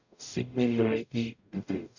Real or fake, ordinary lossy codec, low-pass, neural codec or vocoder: fake; none; 7.2 kHz; codec, 44.1 kHz, 0.9 kbps, DAC